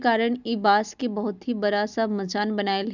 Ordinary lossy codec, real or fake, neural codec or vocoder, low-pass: none; real; none; 7.2 kHz